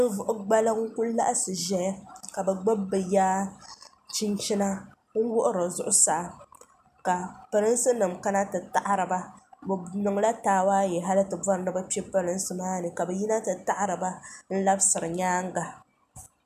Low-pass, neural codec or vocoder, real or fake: 14.4 kHz; none; real